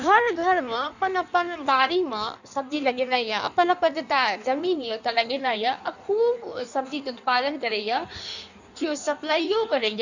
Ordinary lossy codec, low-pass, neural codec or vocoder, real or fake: none; 7.2 kHz; codec, 16 kHz in and 24 kHz out, 1.1 kbps, FireRedTTS-2 codec; fake